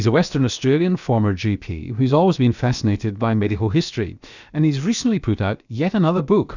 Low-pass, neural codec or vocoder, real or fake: 7.2 kHz; codec, 16 kHz, about 1 kbps, DyCAST, with the encoder's durations; fake